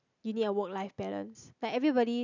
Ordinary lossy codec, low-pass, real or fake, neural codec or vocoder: none; 7.2 kHz; real; none